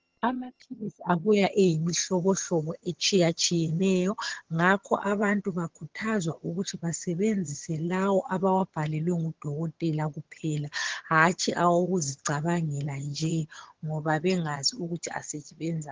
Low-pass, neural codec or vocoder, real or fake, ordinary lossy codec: 7.2 kHz; vocoder, 22.05 kHz, 80 mel bands, HiFi-GAN; fake; Opus, 16 kbps